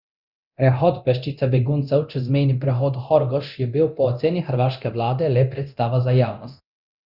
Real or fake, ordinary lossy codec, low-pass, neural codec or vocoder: fake; Opus, 64 kbps; 5.4 kHz; codec, 24 kHz, 0.9 kbps, DualCodec